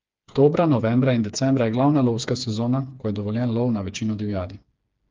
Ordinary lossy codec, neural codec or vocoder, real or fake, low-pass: Opus, 32 kbps; codec, 16 kHz, 4 kbps, FreqCodec, smaller model; fake; 7.2 kHz